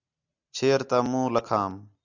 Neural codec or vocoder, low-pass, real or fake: none; 7.2 kHz; real